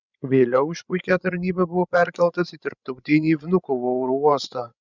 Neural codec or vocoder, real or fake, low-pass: codec, 16 kHz, 16 kbps, FreqCodec, larger model; fake; 7.2 kHz